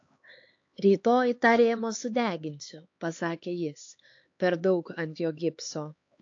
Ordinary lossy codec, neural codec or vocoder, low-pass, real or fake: AAC, 48 kbps; codec, 16 kHz, 4 kbps, X-Codec, HuBERT features, trained on LibriSpeech; 7.2 kHz; fake